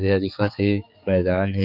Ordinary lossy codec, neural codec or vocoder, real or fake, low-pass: none; codec, 16 kHz, 4 kbps, X-Codec, HuBERT features, trained on general audio; fake; 5.4 kHz